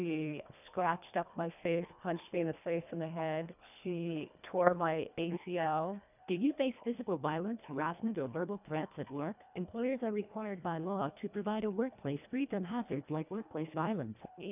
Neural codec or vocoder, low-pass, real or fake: codec, 24 kHz, 1.5 kbps, HILCodec; 3.6 kHz; fake